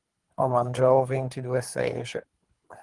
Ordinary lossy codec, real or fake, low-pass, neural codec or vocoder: Opus, 24 kbps; fake; 10.8 kHz; codec, 24 kHz, 3 kbps, HILCodec